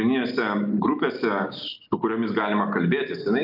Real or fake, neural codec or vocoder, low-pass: real; none; 5.4 kHz